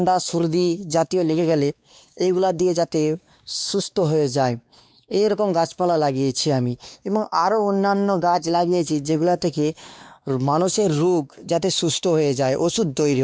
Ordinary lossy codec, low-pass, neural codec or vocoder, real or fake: none; none; codec, 16 kHz, 2 kbps, X-Codec, WavLM features, trained on Multilingual LibriSpeech; fake